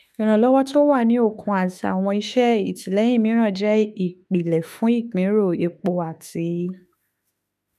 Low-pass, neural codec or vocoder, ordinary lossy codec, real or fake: 14.4 kHz; autoencoder, 48 kHz, 32 numbers a frame, DAC-VAE, trained on Japanese speech; none; fake